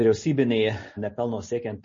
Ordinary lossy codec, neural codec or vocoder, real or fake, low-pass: MP3, 32 kbps; none; real; 7.2 kHz